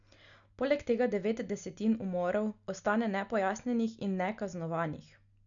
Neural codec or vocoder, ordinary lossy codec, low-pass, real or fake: none; none; 7.2 kHz; real